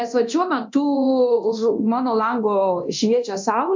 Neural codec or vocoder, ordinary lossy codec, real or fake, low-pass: codec, 24 kHz, 0.9 kbps, DualCodec; MP3, 64 kbps; fake; 7.2 kHz